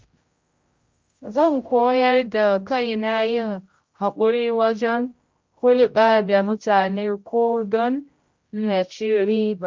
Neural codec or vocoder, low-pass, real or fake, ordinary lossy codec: codec, 16 kHz, 0.5 kbps, X-Codec, HuBERT features, trained on general audio; 7.2 kHz; fake; Opus, 32 kbps